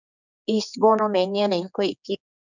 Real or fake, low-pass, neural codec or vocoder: fake; 7.2 kHz; codec, 16 kHz, 4 kbps, X-Codec, HuBERT features, trained on general audio